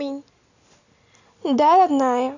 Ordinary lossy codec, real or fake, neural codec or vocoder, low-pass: none; real; none; 7.2 kHz